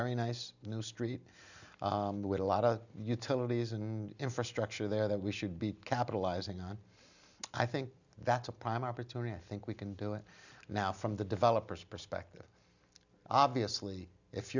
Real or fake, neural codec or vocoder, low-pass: real; none; 7.2 kHz